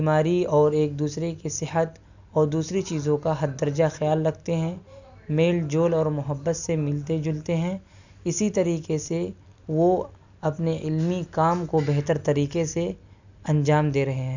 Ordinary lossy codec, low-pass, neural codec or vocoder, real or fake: none; 7.2 kHz; none; real